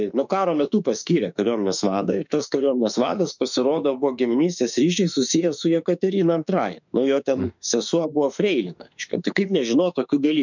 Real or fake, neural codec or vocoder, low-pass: fake; autoencoder, 48 kHz, 32 numbers a frame, DAC-VAE, trained on Japanese speech; 7.2 kHz